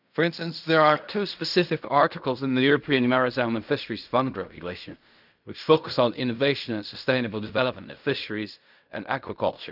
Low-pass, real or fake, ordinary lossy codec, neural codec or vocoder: 5.4 kHz; fake; none; codec, 16 kHz in and 24 kHz out, 0.4 kbps, LongCat-Audio-Codec, fine tuned four codebook decoder